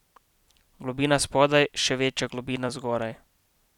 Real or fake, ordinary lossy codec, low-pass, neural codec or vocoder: fake; none; 19.8 kHz; vocoder, 44.1 kHz, 128 mel bands every 256 samples, BigVGAN v2